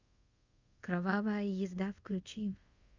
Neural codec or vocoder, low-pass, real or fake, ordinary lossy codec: codec, 24 kHz, 0.5 kbps, DualCodec; 7.2 kHz; fake; Opus, 64 kbps